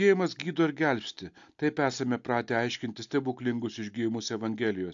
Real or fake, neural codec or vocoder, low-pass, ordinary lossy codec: real; none; 7.2 kHz; AAC, 64 kbps